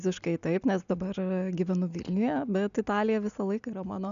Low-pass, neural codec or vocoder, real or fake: 7.2 kHz; none; real